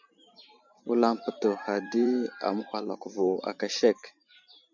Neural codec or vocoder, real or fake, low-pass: vocoder, 44.1 kHz, 128 mel bands every 512 samples, BigVGAN v2; fake; 7.2 kHz